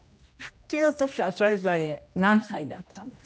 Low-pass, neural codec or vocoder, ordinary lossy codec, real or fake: none; codec, 16 kHz, 1 kbps, X-Codec, HuBERT features, trained on general audio; none; fake